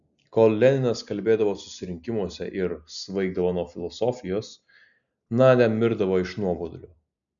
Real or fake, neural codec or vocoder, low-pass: real; none; 7.2 kHz